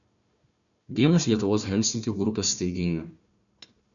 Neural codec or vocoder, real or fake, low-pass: codec, 16 kHz, 1 kbps, FunCodec, trained on Chinese and English, 50 frames a second; fake; 7.2 kHz